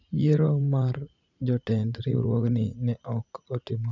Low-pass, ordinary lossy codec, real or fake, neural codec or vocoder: 7.2 kHz; none; fake; vocoder, 44.1 kHz, 80 mel bands, Vocos